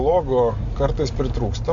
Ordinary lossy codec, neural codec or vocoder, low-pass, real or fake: AAC, 64 kbps; none; 7.2 kHz; real